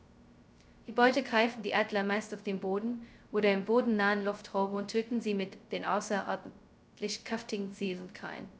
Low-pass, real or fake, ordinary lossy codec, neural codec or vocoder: none; fake; none; codec, 16 kHz, 0.2 kbps, FocalCodec